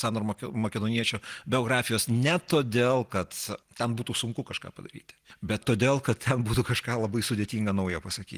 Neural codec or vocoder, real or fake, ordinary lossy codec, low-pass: none; real; Opus, 24 kbps; 14.4 kHz